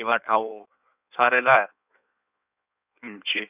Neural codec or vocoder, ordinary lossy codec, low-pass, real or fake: codec, 16 kHz in and 24 kHz out, 1.1 kbps, FireRedTTS-2 codec; none; 3.6 kHz; fake